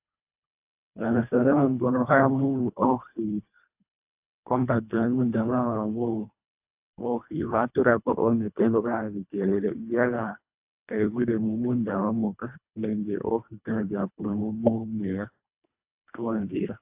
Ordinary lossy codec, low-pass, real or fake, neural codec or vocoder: AAC, 32 kbps; 3.6 kHz; fake; codec, 24 kHz, 1.5 kbps, HILCodec